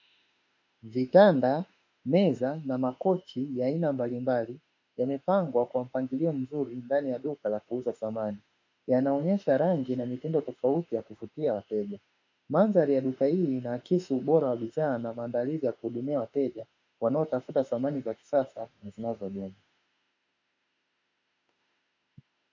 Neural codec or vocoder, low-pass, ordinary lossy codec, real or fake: autoencoder, 48 kHz, 32 numbers a frame, DAC-VAE, trained on Japanese speech; 7.2 kHz; MP3, 64 kbps; fake